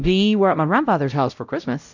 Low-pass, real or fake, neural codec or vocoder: 7.2 kHz; fake; codec, 16 kHz, 0.5 kbps, X-Codec, WavLM features, trained on Multilingual LibriSpeech